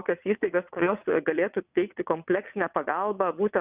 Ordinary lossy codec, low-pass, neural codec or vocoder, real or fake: Opus, 64 kbps; 3.6 kHz; vocoder, 22.05 kHz, 80 mel bands, WaveNeXt; fake